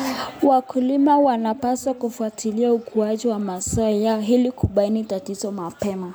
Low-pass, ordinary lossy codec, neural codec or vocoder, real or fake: none; none; none; real